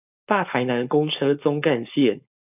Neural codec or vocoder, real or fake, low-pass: codec, 16 kHz, 4.8 kbps, FACodec; fake; 3.6 kHz